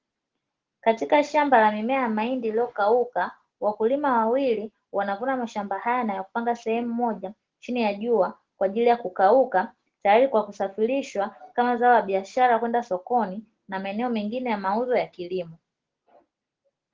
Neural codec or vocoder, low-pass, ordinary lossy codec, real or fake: none; 7.2 kHz; Opus, 16 kbps; real